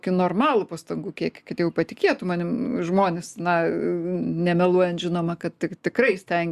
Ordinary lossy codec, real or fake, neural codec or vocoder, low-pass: Opus, 64 kbps; real; none; 14.4 kHz